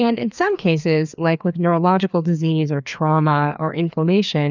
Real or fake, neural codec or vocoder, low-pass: fake; codec, 16 kHz, 2 kbps, FreqCodec, larger model; 7.2 kHz